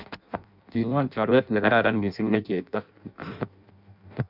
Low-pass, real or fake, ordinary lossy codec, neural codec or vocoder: 5.4 kHz; fake; none; codec, 16 kHz in and 24 kHz out, 0.6 kbps, FireRedTTS-2 codec